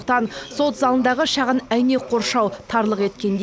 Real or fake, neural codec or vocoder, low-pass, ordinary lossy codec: real; none; none; none